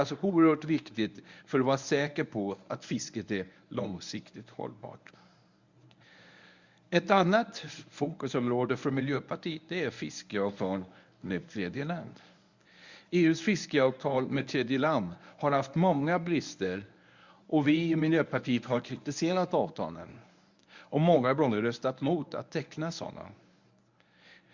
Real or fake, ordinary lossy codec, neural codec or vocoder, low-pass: fake; Opus, 64 kbps; codec, 24 kHz, 0.9 kbps, WavTokenizer, medium speech release version 1; 7.2 kHz